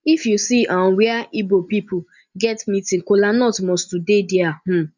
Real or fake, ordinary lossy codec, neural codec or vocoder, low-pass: real; none; none; 7.2 kHz